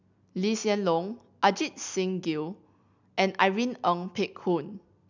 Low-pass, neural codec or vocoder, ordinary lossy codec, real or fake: 7.2 kHz; none; none; real